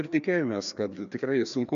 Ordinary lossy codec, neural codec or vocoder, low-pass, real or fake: MP3, 64 kbps; codec, 16 kHz, 2 kbps, FreqCodec, larger model; 7.2 kHz; fake